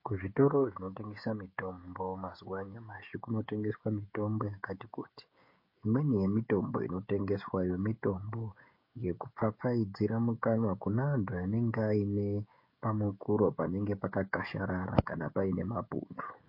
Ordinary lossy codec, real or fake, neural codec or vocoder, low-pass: MP3, 32 kbps; fake; codec, 16 kHz, 16 kbps, FreqCodec, smaller model; 5.4 kHz